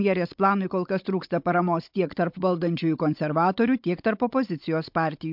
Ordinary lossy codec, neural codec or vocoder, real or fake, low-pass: MP3, 48 kbps; codec, 16 kHz, 16 kbps, FunCodec, trained on Chinese and English, 50 frames a second; fake; 5.4 kHz